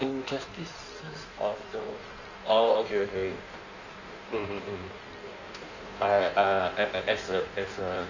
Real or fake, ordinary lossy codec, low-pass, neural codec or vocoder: fake; none; 7.2 kHz; codec, 16 kHz in and 24 kHz out, 1.1 kbps, FireRedTTS-2 codec